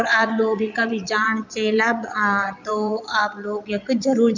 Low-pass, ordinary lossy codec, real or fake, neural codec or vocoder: 7.2 kHz; none; fake; vocoder, 22.05 kHz, 80 mel bands, Vocos